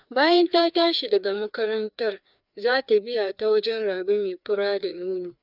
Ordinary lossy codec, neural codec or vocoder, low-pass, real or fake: none; codec, 16 kHz, 2 kbps, FreqCodec, larger model; 5.4 kHz; fake